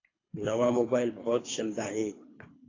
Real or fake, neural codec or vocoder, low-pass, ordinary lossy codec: fake; codec, 24 kHz, 3 kbps, HILCodec; 7.2 kHz; AAC, 32 kbps